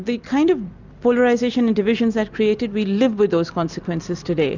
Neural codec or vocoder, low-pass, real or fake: none; 7.2 kHz; real